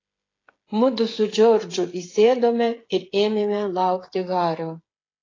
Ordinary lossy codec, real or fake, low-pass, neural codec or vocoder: AAC, 32 kbps; fake; 7.2 kHz; codec, 16 kHz, 8 kbps, FreqCodec, smaller model